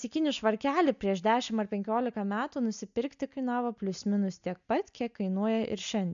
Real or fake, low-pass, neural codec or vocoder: real; 7.2 kHz; none